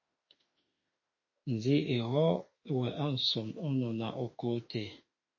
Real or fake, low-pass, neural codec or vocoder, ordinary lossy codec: fake; 7.2 kHz; autoencoder, 48 kHz, 32 numbers a frame, DAC-VAE, trained on Japanese speech; MP3, 32 kbps